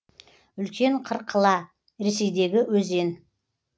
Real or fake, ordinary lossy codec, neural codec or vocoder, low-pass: real; none; none; none